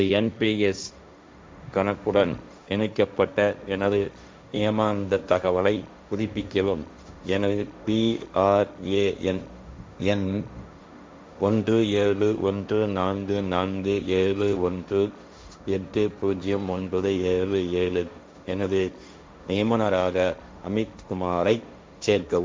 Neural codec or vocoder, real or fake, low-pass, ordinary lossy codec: codec, 16 kHz, 1.1 kbps, Voila-Tokenizer; fake; none; none